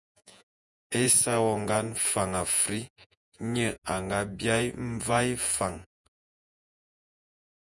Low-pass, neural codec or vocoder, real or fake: 10.8 kHz; vocoder, 48 kHz, 128 mel bands, Vocos; fake